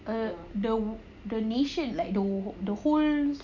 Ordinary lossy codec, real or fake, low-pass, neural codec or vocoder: none; real; 7.2 kHz; none